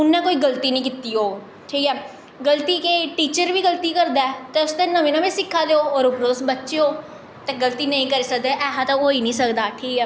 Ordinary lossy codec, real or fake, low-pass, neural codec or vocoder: none; real; none; none